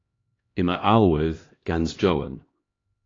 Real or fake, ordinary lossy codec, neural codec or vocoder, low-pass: fake; AAC, 32 kbps; codec, 16 kHz, 1 kbps, X-Codec, HuBERT features, trained on LibriSpeech; 7.2 kHz